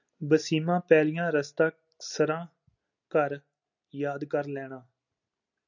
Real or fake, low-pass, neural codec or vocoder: real; 7.2 kHz; none